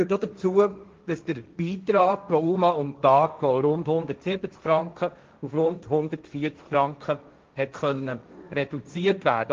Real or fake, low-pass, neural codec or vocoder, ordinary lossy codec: fake; 7.2 kHz; codec, 16 kHz, 1.1 kbps, Voila-Tokenizer; Opus, 32 kbps